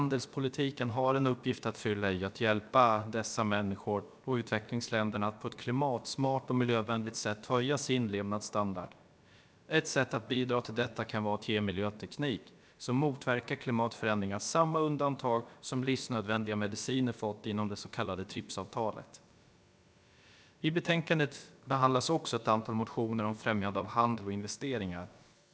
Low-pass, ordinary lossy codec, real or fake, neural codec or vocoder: none; none; fake; codec, 16 kHz, about 1 kbps, DyCAST, with the encoder's durations